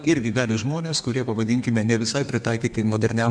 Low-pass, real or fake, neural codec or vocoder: 9.9 kHz; fake; codec, 32 kHz, 1.9 kbps, SNAC